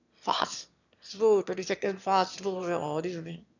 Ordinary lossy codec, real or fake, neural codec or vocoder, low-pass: none; fake; autoencoder, 22.05 kHz, a latent of 192 numbers a frame, VITS, trained on one speaker; 7.2 kHz